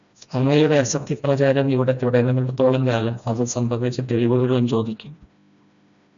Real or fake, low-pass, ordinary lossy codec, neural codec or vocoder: fake; 7.2 kHz; MP3, 64 kbps; codec, 16 kHz, 1 kbps, FreqCodec, smaller model